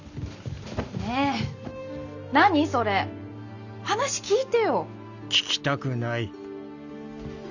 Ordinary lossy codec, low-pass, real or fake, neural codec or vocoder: none; 7.2 kHz; real; none